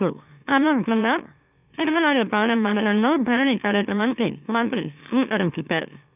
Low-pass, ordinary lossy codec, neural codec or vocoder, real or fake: 3.6 kHz; none; autoencoder, 44.1 kHz, a latent of 192 numbers a frame, MeloTTS; fake